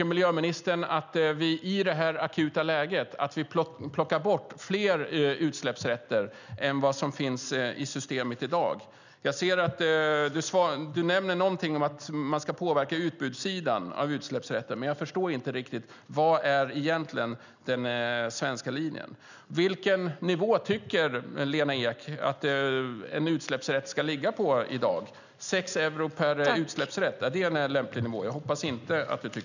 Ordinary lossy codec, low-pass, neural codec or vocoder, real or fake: none; 7.2 kHz; none; real